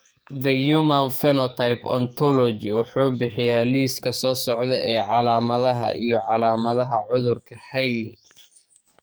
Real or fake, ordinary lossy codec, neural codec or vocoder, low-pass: fake; none; codec, 44.1 kHz, 2.6 kbps, SNAC; none